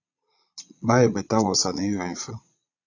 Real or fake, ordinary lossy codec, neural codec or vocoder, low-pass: fake; AAC, 48 kbps; vocoder, 22.05 kHz, 80 mel bands, Vocos; 7.2 kHz